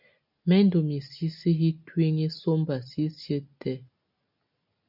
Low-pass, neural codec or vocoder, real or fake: 5.4 kHz; none; real